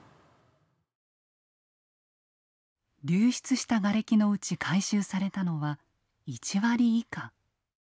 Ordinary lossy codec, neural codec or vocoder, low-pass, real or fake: none; none; none; real